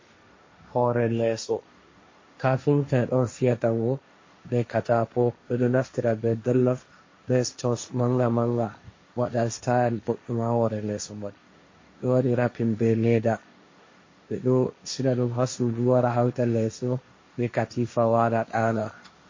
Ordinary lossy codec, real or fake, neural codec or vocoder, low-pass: MP3, 32 kbps; fake; codec, 16 kHz, 1.1 kbps, Voila-Tokenizer; 7.2 kHz